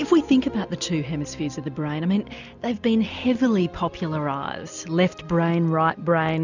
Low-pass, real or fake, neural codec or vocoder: 7.2 kHz; real; none